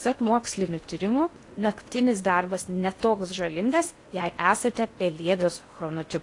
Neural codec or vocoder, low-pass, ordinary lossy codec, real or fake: codec, 16 kHz in and 24 kHz out, 0.6 kbps, FocalCodec, streaming, 2048 codes; 10.8 kHz; AAC, 48 kbps; fake